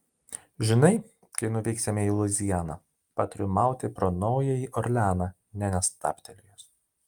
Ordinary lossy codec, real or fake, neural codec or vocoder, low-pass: Opus, 32 kbps; real; none; 19.8 kHz